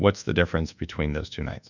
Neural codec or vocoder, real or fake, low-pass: codec, 24 kHz, 1.2 kbps, DualCodec; fake; 7.2 kHz